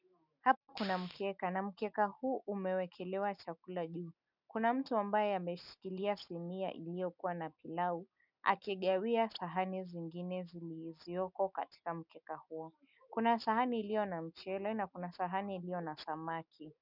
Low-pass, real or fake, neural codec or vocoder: 5.4 kHz; real; none